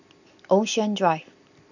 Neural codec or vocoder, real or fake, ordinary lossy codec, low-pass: none; real; none; 7.2 kHz